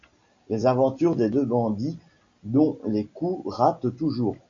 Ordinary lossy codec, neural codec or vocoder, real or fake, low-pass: AAC, 48 kbps; none; real; 7.2 kHz